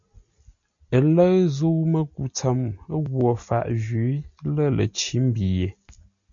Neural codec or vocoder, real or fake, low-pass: none; real; 7.2 kHz